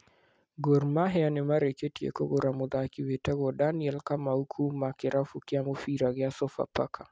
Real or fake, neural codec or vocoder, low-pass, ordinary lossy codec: real; none; none; none